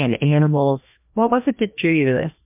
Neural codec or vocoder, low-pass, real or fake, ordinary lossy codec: codec, 16 kHz, 1 kbps, FreqCodec, larger model; 3.6 kHz; fake; MP3, 32 kbps